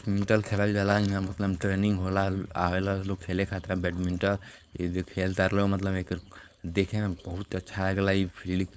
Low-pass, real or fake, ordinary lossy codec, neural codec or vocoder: none; fake; none; codec, 16 kHz, 4.8 kbps, FACodec